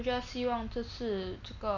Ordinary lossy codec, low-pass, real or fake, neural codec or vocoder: none; 7.2 kHz; real; none